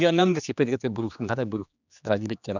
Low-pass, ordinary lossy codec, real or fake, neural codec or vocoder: 7.2 kHz; none; fake; codec, 16 kHz, 2 kbps, X-Codec, HuBERT features, trained on general audio